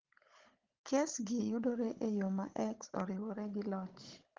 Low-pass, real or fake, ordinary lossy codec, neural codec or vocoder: 7.2 kHz; fake; Opus, 16 kbps; codec, 16 kHz, 16 kbps, FunCodec, trained on Chinese and English, 50 frames a second